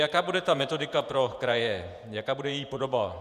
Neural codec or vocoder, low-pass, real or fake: vocoder, 48 kHz, 128 mel bands, Vocos; 14.4 kHz; fake